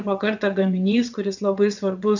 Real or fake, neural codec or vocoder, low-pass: fake; vocoder, 22.05 kHz, 80 mel bands, WaveNeXt; 7.2 kHz